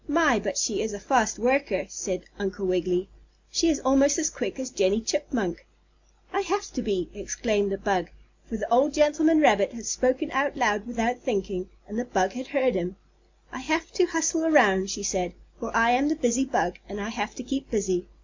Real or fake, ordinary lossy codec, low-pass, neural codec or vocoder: real; MP3, 64 kbps; 7.2 kHz; none